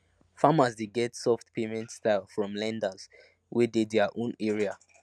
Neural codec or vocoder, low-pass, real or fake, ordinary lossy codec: none; none; real; none